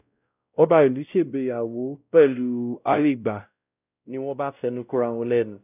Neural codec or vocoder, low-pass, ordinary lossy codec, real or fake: codec, 16 kHz, 0.5 kbps, X-Codec, WavLM features, trained on Multilingual LibriSpeech; 3.6 kHz; none; fake